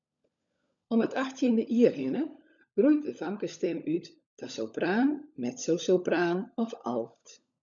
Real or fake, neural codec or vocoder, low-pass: fake; codec, 16 kHz, 16 kbps, FunCodec, trained on LibriTTS, 50 frames a second; 7.2 kHz